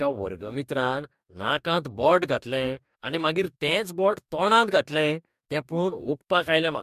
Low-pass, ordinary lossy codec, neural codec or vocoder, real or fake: 14.4 kHz; MP3, 96 kbps; codec, 44.1 kHz, 2.6 kbps, DAC; fake